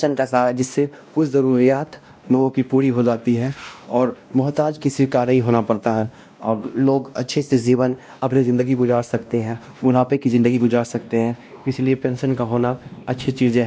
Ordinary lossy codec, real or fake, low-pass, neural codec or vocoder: none; fake; none; codec, 16 kHz, 1 kbps, X-Codec, WavLM features, trained on Multilingual LibriSpeech